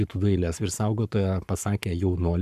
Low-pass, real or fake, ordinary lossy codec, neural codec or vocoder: 14.4 kHz; fake; AAC, 96 kbps; codec, 44.1 kHz, 7.8 kbps, Pupu-Codec